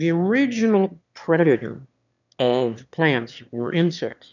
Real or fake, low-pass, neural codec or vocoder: fake; 7.2 kHz; autoencoder, 22.05 kHz, a latent of 192 numbers a frame, VITS, trained on one speaker